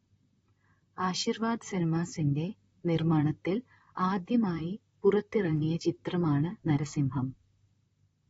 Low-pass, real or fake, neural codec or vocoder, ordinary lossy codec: 19.8 kHz; fake; vocoder, 44.1 kHz, 128 mel bands, Pupu-Vocoder; AAC, 24 kbps